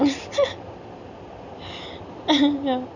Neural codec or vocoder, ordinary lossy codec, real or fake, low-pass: none; none; real; 7.2 kHz